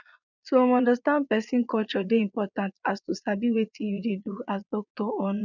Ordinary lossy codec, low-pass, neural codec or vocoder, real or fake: none; 7.2 kHz; vocoder, 22.05 kHz, 80 mel bands, Vocos; fake